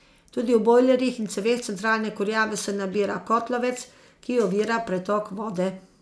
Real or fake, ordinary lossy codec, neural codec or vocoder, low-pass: real; none; none; none